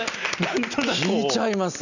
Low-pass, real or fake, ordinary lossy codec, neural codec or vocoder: 7.2 kHz; real; none; none